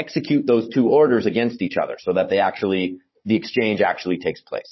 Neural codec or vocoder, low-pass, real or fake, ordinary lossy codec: codec, 16 kHz, 16 kbps, FunCodec, trained on Chinese and English, 50 frames a second; 7.2 kHz; fake; MP3, 24 kbps